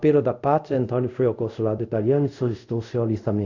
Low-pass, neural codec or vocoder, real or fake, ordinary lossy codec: 7.2 kHz; codec, 24 kHz, 0.5 kbps, DualCodec; fake; none